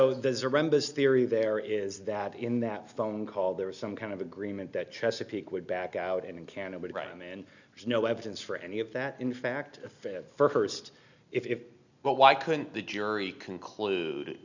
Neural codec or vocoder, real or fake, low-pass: none; real; 7.2 kHz